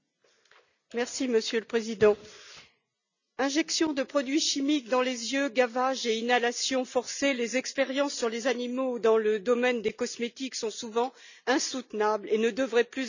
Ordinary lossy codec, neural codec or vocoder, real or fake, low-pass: none; none; real; 7.2 kHz